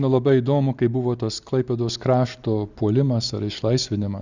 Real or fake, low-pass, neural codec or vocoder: real; 7.2 kHz; none